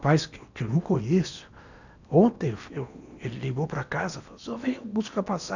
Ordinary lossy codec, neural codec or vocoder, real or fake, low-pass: none; codec, 16 kHz in and 24 kHz out, 0.8 kbps, FocalCodec, streaming, 65536 codes; fake; 7.2 kHz